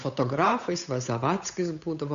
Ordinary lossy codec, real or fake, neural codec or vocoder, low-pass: AAC, 96 kbps; fake; codec, 16 kHz, 8 kbps, FunCodec, trained on Chinese and English, 25 frames a second; 7.2 kHz